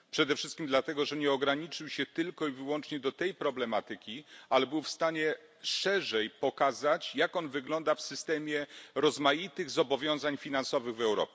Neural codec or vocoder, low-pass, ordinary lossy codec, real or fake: none; none; none; real